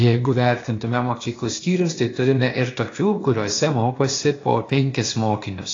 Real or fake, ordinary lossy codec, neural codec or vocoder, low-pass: fake; AAC, 32 kbps; codec, 16 kHz, 0.8 kbps, ZipCodec; 7.2 kHz